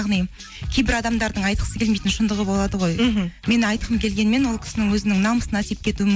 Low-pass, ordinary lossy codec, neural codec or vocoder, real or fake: none; none; none; real